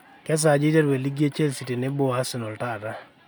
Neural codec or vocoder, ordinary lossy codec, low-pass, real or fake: none; none; none; real